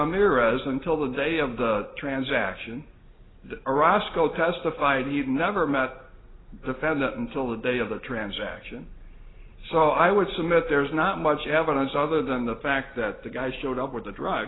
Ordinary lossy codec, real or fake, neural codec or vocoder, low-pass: AAC, 16 kbps; real; none; 7.2 kHz